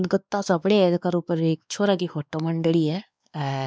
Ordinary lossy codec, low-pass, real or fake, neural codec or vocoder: none; none; fake; codec, 16 kHz, 2 kbps, X-Codec, WavLM features, trained on Multilingual LibriSpeech